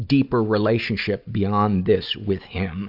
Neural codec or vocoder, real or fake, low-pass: none; real; 5.4 kHz